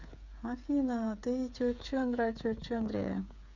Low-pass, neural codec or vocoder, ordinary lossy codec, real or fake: 7.2 kHz; codec, 16 kHz, 8 kbps, FreqCodec, smaller model; none; fake